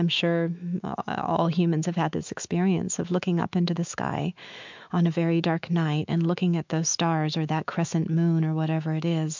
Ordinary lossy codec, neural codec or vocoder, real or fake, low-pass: MP3, 64 kbps; autoencoder, 48 kHz, 128 numbers a frame, DAC-VAE, trained on Japanese speech; fake; 7.2 kHz